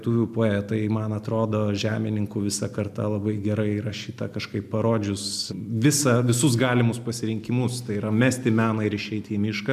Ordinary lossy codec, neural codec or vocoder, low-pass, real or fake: MP3, 96 kbps; none; 14.4 kHz; real